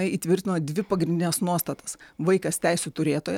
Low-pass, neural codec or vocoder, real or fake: 19.8 kHz; none; real